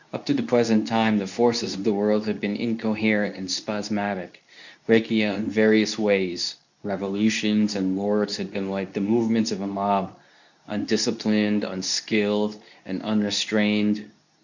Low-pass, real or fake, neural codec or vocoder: 7.2 kHz; fake; codec, 24 kHz, 0.9 kbps, WavTokenizer, medium speech release version 2